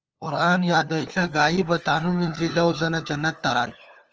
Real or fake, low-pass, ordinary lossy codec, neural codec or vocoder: fake; 7.2 kHz; Opus, 24 kbps; codec, 16 kHz, 4 kbps, FunCodec, trained on LibriTTS, 50 frames a second